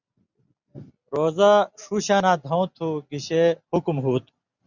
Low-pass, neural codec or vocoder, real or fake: 7.2 kHz; none; real